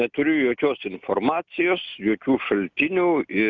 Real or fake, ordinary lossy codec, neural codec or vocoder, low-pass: real; Opus, 64 kbps; none; 7.2 kHz